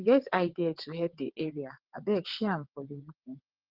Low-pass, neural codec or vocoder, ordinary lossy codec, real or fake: 5.4 kHz; vocoder, 22.05 kHz, 80 mel bands, WaveNeXt; Opus, 16 kbps; fake